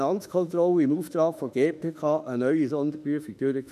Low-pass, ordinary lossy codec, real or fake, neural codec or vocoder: 14.4 kHz; none; fake; autoencoder, 48 kHz, 32 numbers a frame, DAC-VAE, trained on Japanese speech